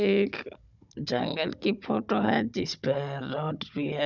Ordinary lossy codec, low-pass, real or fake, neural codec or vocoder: none; 7.2 kHz; fake; codec, 16 kHz, 16 kbps, FunCodec, trained on Chinese and English, 50 frames a second